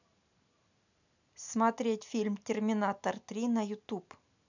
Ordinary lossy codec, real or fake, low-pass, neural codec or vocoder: none; real; 7.2 kHz; none